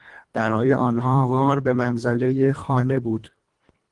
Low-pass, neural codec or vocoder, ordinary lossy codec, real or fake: 10.8 kHz; codec, 24 kHz, 1.5 kbps, HILCodec; Opus, 24 kbps; fake